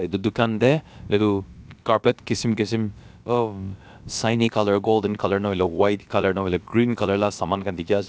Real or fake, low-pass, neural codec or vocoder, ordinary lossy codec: fake; none; codec, 16 kHz, about 1 kbps, DyCAST, with the encoder's durations; none